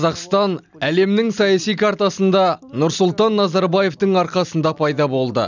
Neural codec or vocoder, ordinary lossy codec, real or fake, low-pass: none; none; real; 7.2 kHz